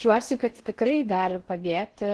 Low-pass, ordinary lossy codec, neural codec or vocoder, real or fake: 10.8 kHz; Opus, 16 kbps; codec, 16 kHz in and 24 kHz out, 0.6 kbps, FocalCodec, streaming, 4096 codes; fake